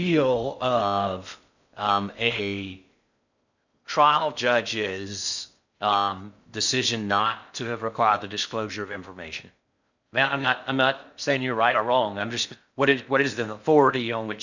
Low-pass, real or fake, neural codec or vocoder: 7.2 kHz; fake; codec, 16 kHz in and 24 kHz out, 0.6 kbps, FocalCodec, streaming, 2048 codes